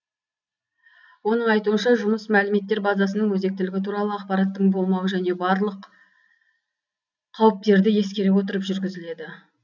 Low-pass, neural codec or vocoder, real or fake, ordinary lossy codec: 7.2 kHz; none; real; none